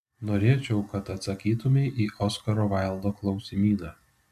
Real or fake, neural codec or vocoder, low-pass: real; none; 14.4 kHz